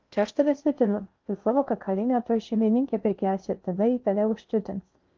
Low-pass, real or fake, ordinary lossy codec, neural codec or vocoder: 7.2 kHz; fake; Opus, 32 kbps; codec, 16 kHz in and 24 kHz out, 0.6 kbps, FocalCodec, streaming, 4096 codes